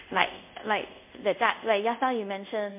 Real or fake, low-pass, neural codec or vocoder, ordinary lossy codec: fake; 3.6 kHz; codec, 24 kHz, 0.5 kbps, DualCodec; MP3, 32 kbps